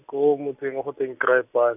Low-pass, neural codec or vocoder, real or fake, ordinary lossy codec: 3.6 kHz; none; real; none